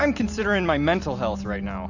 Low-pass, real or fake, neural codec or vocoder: 7.2 kHz; real; none